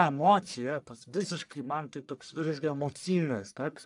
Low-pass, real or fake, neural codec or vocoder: 10.8 kHz; fake; codec, 44.1 kHz, 1.7 kbps, Pupu-Codec